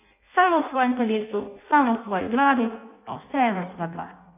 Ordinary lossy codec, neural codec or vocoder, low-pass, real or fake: none; codec, 16 kHz in and 24 kHz out, 0.6 kbps, FireRedTTS-2 codec; 3.6 kHz; fake